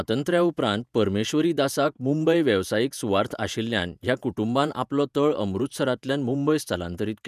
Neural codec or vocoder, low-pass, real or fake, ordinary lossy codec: vocoder, 48 kHz, 128 mel bands, Vocos; 14.4 kHz; fake; none